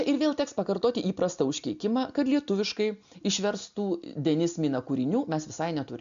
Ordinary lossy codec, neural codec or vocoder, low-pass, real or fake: MP3, 64 kbps; none; 7.2 kHz; real